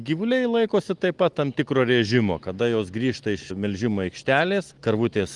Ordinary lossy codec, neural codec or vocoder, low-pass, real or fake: Opus, 24 kbps; none; 10.8 kHz; real